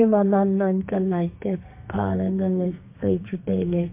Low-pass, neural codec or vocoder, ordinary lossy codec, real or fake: 3.6 kHz; codec, 32 kHz, 1.9 kbps, SNAC; MP3, 24 kbps; fake